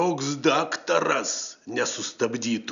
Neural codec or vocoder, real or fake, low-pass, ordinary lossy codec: none; real; 7.2 kHz; MP3, 96 kbps